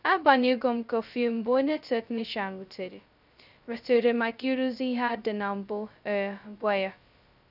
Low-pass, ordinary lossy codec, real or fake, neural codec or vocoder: 5.4 kHz; none; fake; codec, 16 kHz, 0.2 kbps, FocalCodec